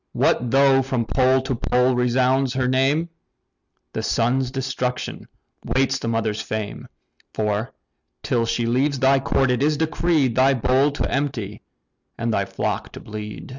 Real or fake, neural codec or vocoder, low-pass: real; none; 7.2 kHz